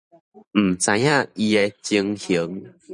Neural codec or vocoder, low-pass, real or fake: vocoder, 44.1 kHz, 128 mel bands every 512 samples, BigVGAN v2; 10.8 kHz; fake